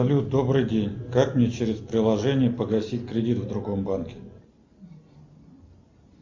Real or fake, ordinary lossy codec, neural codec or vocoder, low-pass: real; MP3, 64 kbps; none; 7.2 kHz